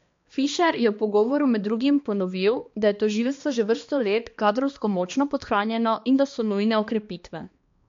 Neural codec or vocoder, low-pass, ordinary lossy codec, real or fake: codec, 16 kHz, 2 kbps, X-Codec, HuBERT features, trained on balanced general audio; 7.2 kHz; MP3, 48 kbps; fake